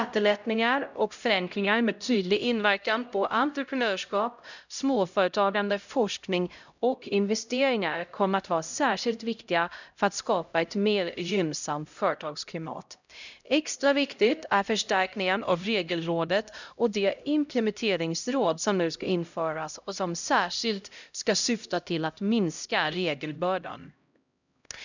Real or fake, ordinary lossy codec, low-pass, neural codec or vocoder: fake; none; 7.2 kHz; codec, 16 kHz, 0.5 kbps, X-Codec, HuBERT features, trained on LibriSpeech